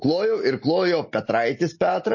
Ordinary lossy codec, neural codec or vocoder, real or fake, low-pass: MP3, 32 kbps; none; real; 7.2 kHz